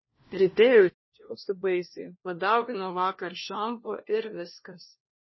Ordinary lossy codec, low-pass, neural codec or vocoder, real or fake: MP3, 24 kbps; 7.2 kHz; codec, 16 kHz, 1 kbps, FunCodec, trained on LibriTTS, 50 frames a second; fake